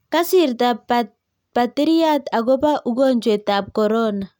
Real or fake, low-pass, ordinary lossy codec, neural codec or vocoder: real; 19.8 kHz; none; none